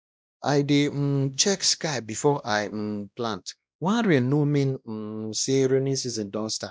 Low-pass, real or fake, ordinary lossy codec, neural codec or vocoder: none; fake; none; codec, 16 kHz, 1 kbps, X-Codec, WavLM features, trained on Multilingual LibriSpeech